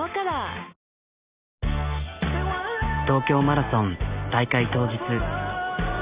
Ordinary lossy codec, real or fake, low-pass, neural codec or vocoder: Opus, 64 kbps; real; 3.6 kHz; none